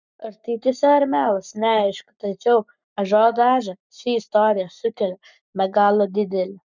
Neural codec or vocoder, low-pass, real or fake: codec, 44.1 kHz, 7.8 kbps, Pupu-Codec; 7.2 kHz; fake